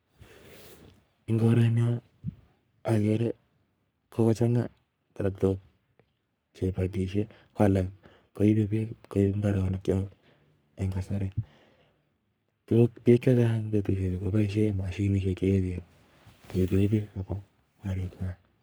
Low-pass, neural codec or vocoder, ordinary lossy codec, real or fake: none; codec, 44.1 kHz, 3.4 kbps, Pupu-Codec; none; fake